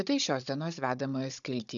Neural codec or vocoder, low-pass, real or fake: codec, 16 kHz, 16 kbps, FunCodec, trained on Chinese and English, 50 frames a second; 7.2 kHz; fake